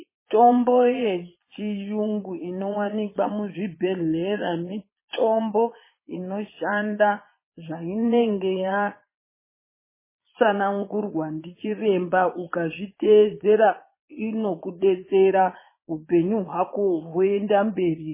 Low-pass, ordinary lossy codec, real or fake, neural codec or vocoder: 3.6 kHz; MP3, 16 kbps; fake; vocoder, 22.05 kHz, 80 mel bands, Vocos